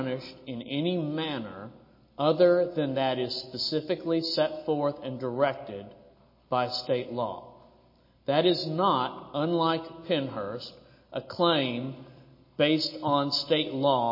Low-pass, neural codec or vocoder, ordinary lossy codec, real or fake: 5.4 kHz; none; MP3, 24 kbps; real